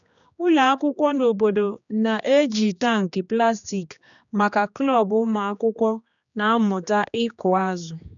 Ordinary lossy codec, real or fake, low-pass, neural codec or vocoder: none; fake; 7.2 kHz; codec, 16 kHz, 2 kbps, X-Codec, HuBERT features, trained on general audio